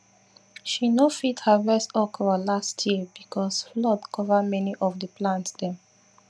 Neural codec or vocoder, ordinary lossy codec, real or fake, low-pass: none; none; real; none